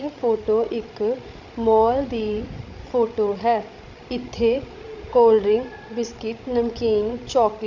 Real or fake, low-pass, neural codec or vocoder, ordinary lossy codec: fake; 7.2 kHz; codec, 16 kHz, 16 kbps, FreqCodec, larger model; none